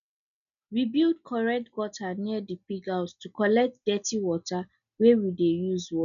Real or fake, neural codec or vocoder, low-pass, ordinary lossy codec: real; none; 7.2 kHz; none